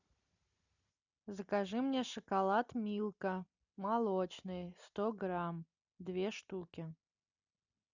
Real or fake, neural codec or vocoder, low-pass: real; none; 7.2 kHz